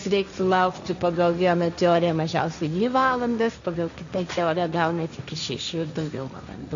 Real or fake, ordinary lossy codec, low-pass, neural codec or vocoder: fake; AAC, 64 kbps; 7.2 kHz; codec, 16 kHz, 1.1 kbps, Voila-Tokenizer